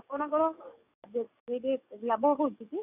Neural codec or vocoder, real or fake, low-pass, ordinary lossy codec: codec, 44.1 kHz, 7.8 kbps, DAC; fake; 3.6 kHz; none